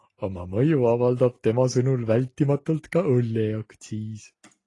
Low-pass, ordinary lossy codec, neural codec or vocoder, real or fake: 10.8 kHz; AAC, 32 kbps; none; real